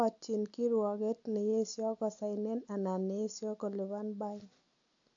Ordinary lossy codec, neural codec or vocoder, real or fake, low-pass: AAC, 48 kbps; none; real; 7.2 kHz